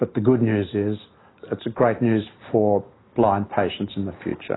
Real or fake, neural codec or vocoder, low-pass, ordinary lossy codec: real; none; 7.2 kHz; AAC, 16 kbps